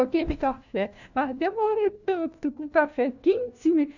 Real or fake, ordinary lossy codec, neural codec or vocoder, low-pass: fake; none; codec, 16 kHz, 1 kbps, FunCodec, trained on LibriTTS, 50 frames a second; 7.2 kHz